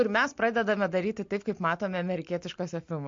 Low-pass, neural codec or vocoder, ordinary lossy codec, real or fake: 7.2 kHz; none; MP3, 48 kbps; real